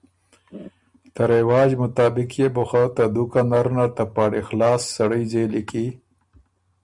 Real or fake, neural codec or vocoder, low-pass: real; none; 10.8 kHz